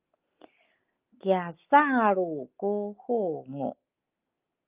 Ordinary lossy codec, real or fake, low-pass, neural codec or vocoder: Opus, 32 kbps; real; 3.6 kHz; none